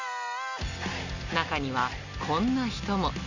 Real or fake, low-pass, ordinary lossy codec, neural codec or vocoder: real; 7.2 kHz; none; none